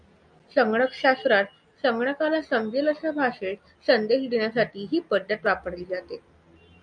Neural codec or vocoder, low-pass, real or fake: none; 9.9 kHz; real